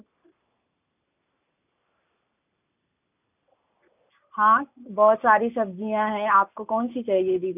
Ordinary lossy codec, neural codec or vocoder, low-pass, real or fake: none; none; 3.6 kHz; real